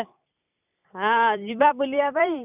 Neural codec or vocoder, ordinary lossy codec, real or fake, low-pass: vocoder, 44.1 kHz, 128 mel bands every 256 samples, BigVGAN v2; none; fake; 3.6 kHz